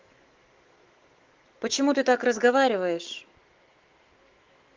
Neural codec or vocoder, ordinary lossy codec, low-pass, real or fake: autoencoder, 48 kHz, 128 numbers a frame, DAC-VAE, trained on Japanese speech; Opus, 16 kbps; 7.2 kHz; fake